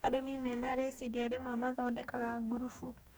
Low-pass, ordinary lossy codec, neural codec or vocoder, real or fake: none; none; codec, 44.1 kHz, 2.6 kbps, DAC; fake